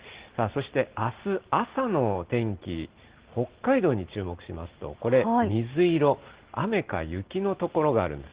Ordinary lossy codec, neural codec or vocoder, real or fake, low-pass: Opus, 16 kbps; none; real; 3.6 kHz